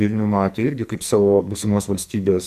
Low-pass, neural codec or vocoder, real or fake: 14.4 kHz; codec, 44.1 kHz, 2.6 kbps, SNAC; fake